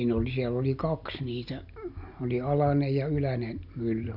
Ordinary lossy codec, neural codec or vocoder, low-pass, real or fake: none; none; 5.4 kHz; real